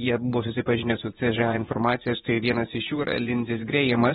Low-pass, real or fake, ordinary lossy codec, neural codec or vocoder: 10.8 kHz; fake; AAC, 16 kbps; vocoder, 24 kHz, 100 mel bands, Vocos